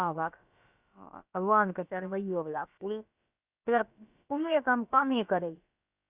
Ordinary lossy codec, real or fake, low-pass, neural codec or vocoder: Opus, 64 kbps; fake; 3.6 kHz; codec, 16 kHz, about 1 kbps, DyCAST, with the encoder's durations